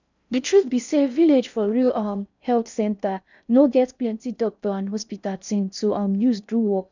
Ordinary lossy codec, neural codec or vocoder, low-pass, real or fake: none; codec, 16 kHz in and 24 kHz out, 0.6 kbps, FocalCodec, streaming, 4096 codes; 7.2 kHz; fake